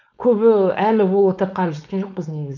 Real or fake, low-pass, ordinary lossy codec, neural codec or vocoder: fake; 7.2 kHz; none; codec, 16 kHz, 4.8 kbps, FACodec